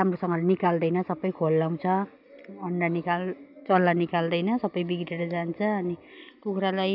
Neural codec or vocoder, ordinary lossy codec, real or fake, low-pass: none; none; real; 5.4 kHz